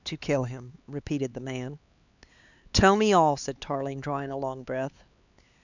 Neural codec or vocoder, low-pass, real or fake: codec, 16 kHz, 4 kbps, X-Codec, HuBERT features, trained on LibriSpeech; 7.2 kHz; fake